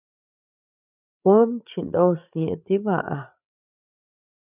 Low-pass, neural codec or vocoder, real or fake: 3.6 kHz; codec, 16 kHz, 8 kbps, FreqCodec, larger model; fake